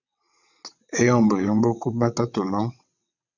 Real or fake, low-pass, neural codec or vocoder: fake; 7.2 kHz; vocoder, 22.05 kHz, 80 mel bands, WaveNeXt